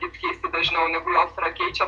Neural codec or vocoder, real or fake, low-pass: vocoder, 48 kHz, 128 mel bands, Vocos; fake; 10.8 kHz